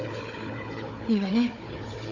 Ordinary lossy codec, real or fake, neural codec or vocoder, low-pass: none; fake; codec, 16 kHz, 4 kbps, FunCodec, trained on Chinese and English, 50 frames a second; 7.2 kHz